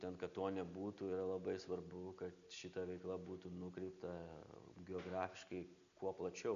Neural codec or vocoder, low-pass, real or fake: none; 7.2 kHz; real